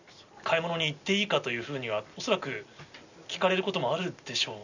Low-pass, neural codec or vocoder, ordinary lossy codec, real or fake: 7.2 kHz; none; none; real